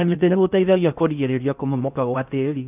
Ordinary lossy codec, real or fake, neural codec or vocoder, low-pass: none; fake; codec, 16 kHz in and 24 kHz out, 0.6 kbps, FocalCodec, streaming, 4096 codes; 3.6 kHz